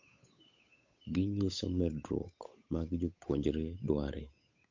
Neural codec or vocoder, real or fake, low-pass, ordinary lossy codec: codec, 16 kHz, 8 kbps, FunCodec, trained on Chinese and English, 25 frames a second; fake; 7.2 kHz; MP3, 48 kbps